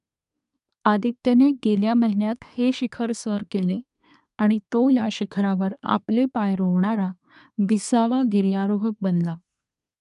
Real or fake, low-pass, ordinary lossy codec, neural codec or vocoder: fake; 10.8 kHz; none; codec, 24 kHz, 1 kbps, SNAC